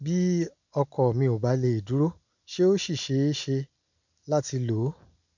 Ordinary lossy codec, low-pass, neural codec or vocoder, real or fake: none; 7.2 kHz; none; real